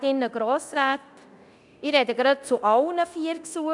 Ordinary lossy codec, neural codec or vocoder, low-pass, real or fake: none; codec, 24 kHz, 0.9 kbps, DualCodec; 10.8 kHz; fake